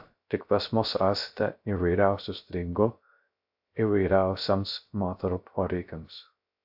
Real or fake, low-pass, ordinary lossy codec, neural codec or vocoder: fake; 5.4 kHz; AAC, 48 kbps; codec, 16 kHz, 0.3 kbps, FocalCodec